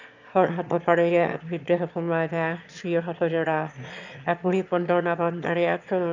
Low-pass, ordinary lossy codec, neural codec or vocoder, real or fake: 7.2 kHz; none; autoencoder, 22.05 kHz, a latent of 192 numbers a frame, VITS, trained on one speaker; fake